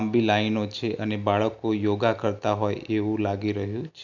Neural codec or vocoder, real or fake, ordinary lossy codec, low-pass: none; real; none; 7.2 kHz